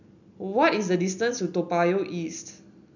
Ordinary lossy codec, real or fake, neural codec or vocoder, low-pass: none; real; none; 7.2 kHz